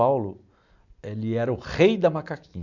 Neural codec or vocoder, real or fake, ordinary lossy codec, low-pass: none; real; none; 7.2 kHz